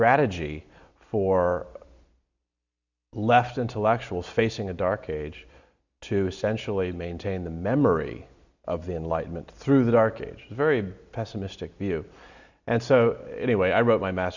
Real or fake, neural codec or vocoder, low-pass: real; none; 7.2 kHz